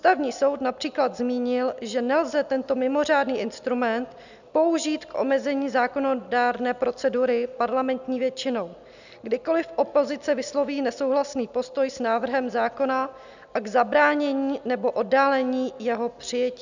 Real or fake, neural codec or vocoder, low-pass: real; none; 7.2 kHz